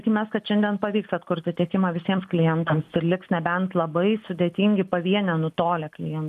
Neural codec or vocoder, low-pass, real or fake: none; 14.4 kHz; real